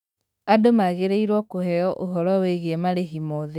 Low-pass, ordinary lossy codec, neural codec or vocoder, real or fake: 19.8 kHz; none; autoencoder, 48 kHz, 32 numbers a frame, DAC-VAE, trained on Japanese speech; fake